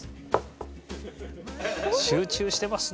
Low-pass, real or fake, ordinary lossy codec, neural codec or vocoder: none; real; none; none